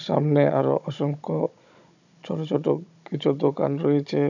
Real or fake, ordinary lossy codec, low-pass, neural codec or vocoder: fake; none; 7.2 kHz; codec, 16 kHz, 16 kbps, FunCodec, trained on Chinese and English, 50 frames a second